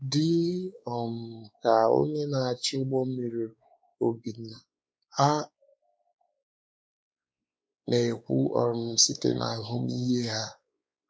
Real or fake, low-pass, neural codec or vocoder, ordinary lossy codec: fake; none; codec, 16 kHz, 4 kbps, X-Codec, WavLM features, trained on Multilingual LibriSpeech; none